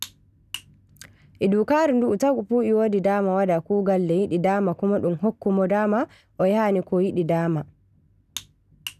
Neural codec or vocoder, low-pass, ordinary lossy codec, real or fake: none; 14.4 kHz; none; real